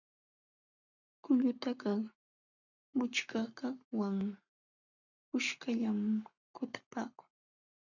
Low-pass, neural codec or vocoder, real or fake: 7.2 kHz; codec, 44.1 kHz, 7.8 kbps, Pupu-Codec; fake